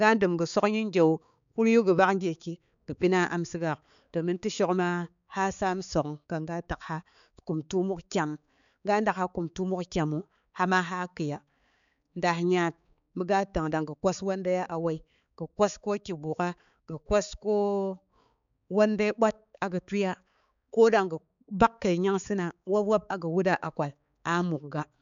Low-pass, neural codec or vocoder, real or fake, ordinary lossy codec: 7.2 kHz; codec, 16 kHz, 4 kbps, X-Codec, HuBERT features, trained on balanced general audio; fake; none